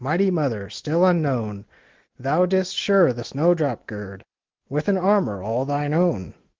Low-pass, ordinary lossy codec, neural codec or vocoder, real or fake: 7.2 kHz; Opus, 16 kbps; none; real